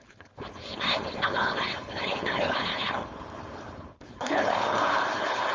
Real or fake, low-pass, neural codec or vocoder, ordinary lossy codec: fake; 7.2 kHz; codec, 16 kHz, 4.8 kbps, FACodec; Opus, 32 kbps